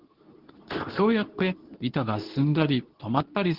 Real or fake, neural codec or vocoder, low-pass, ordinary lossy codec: fake; codec, 24 kHz, 0.9 kbps, WavTokenizer, medium speech release version 2; 5.4 kHz; Opus, 16 kbps